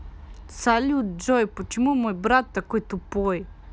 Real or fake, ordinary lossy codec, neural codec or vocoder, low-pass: real; none; none; none